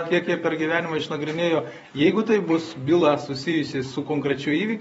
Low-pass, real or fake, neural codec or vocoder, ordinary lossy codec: 19.8 kHz; fake; vocoder, 44.1 kHz, 128 mel bands every 256 samples, BigVGAN v2; AAC, 24 kbps